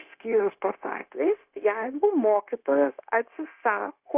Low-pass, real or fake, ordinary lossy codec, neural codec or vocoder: 3.6 kHz; fake; Opus, 64 kbps; codec, 16 kHz, 0.9 kbps, LongCat-Audio-Codec